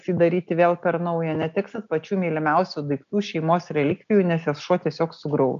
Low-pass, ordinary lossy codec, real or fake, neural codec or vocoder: 7.2 kHz; MP3, 64 kbps; real; none